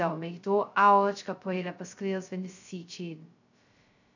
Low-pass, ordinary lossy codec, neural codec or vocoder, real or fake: 7.2 kHz; none; codec, 16 kHz, 0.2 kbps, FocalCodec; fake